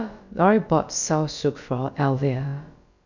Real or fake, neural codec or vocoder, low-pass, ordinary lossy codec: fake; codec, 16 kHz, about 1 kbps, DyCAST, with the encoder's durations; 7.2 kHz; none